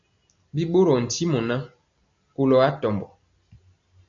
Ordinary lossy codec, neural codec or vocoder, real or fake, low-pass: AAC, 64 kbps; none; real; 7.2 kHz